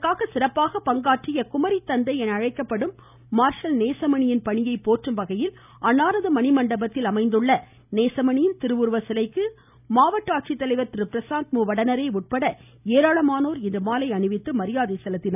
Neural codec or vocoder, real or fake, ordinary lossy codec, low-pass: none; real; none; 3.6 kHz